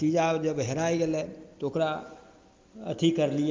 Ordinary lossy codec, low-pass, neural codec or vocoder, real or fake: Opus, 32 kbps; 7.2 kHz; none; real